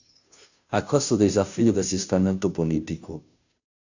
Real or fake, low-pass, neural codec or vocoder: fake; 7.2 kHz; codec, 16 kHz, 0.5 kbps, FunCodec, trained on Chinese and English, 25 frames a second